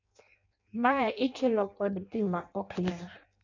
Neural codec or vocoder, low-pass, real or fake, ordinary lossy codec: codec, 16 kHz in and 24 kHz out, 0.6 kbps, FireRedTTS-2 codec; 7.2 kHz; fake; none